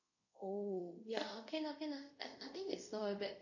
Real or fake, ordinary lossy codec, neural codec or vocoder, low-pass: fake; none; codec, 24 kHz, 0.5 kbps, DualCodec; 7.2 kHz